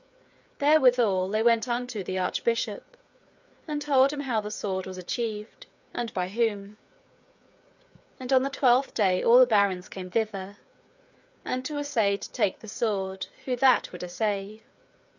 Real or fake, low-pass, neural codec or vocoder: fake; 7.2 kHz; codec, 16 kHz, 8 kbps, FreqCodec, smaller model